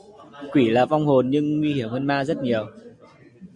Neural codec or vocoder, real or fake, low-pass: none; real; 10.8 kHz